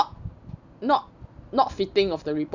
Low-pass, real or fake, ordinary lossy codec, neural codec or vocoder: 7.2 kHz; real; none; none